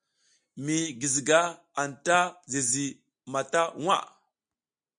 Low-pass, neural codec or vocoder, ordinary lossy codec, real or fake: 9.9 kHz; none; MP3, 64 kbps; real